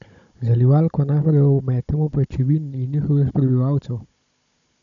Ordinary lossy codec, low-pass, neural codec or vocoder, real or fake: none; 7.2 kHz; codec, 16 kHz, 16 kbps, FunCodec, trained on Chinese and English, 50 frames a second; fake